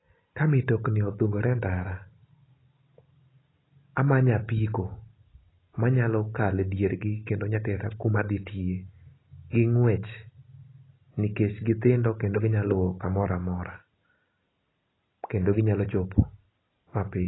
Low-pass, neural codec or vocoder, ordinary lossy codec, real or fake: 7.2 kHz; none; AAC, 16 kbps; real